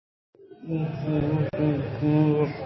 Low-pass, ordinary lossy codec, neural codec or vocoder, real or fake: 7.2 kHz; MP3, 24 kbps; none; real